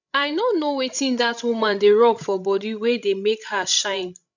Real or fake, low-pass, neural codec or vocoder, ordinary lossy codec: fake; 7.2 kHz; codec, 16 kHz, 16 kbps, FreqCodec, larger model; none